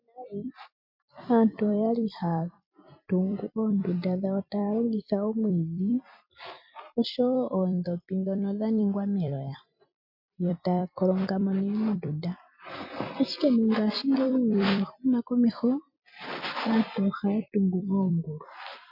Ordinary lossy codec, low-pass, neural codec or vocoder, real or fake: MP3, 48 kbps; 5.4 kHz; vocoder, 44.1 kHz, 128 mel bands every 256 samples, BigVGAN v2; fake